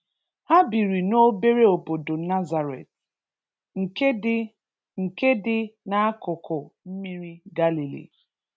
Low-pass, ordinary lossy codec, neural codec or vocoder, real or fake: none; none; none; real